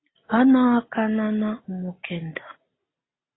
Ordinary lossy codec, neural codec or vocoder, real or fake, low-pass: AAC, 16 kbps; none; real; 7.2 kHz